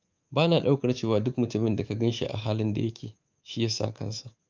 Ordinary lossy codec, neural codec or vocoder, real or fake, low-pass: Opus, 24 kbps; codec, 24 kHz, 3.1 kbps, DualCodec; fake; 7.2 kHz